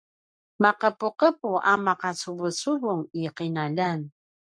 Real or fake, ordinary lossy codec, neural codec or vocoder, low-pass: fake; MP3, 64 kbps; codec, 44.1 kHz, 7.8 kbps, Pupu-Codec; 9.9 kHz